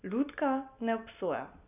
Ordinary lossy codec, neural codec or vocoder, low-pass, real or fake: none; none; 3.6 kHz; real